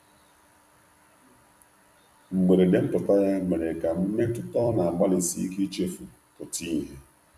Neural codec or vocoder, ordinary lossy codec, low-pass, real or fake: vocoder, 44.1 kHz, 128 mel bands every 256 samples, BigVGAN v2; none; 14.4 kHz; fake